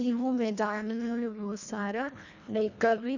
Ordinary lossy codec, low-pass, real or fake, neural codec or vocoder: none; 7.2 kHz; fake; codec, 24 kHz, 1.5 kbps, HILCodec